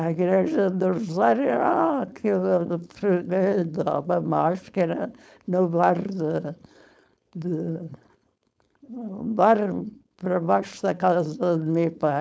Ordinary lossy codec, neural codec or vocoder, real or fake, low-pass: none; codec, 16 kHz, 4.8 kbps, FACodec; fake; none